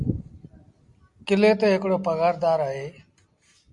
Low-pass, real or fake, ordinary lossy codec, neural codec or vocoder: 10.8 kHz; real; Opus, 64 kbps; none